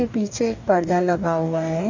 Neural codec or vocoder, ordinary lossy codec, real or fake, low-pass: codec, 44.1 kHz, 2.6 kbps, DAC; none; fake; 7.2 kHz